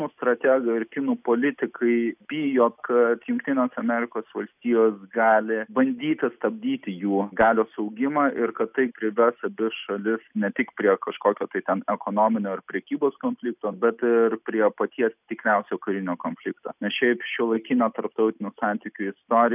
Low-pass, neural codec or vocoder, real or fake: 3.6 kHz; none; real